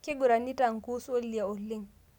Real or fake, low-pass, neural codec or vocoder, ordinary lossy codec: real; 19.8 kHz; none; none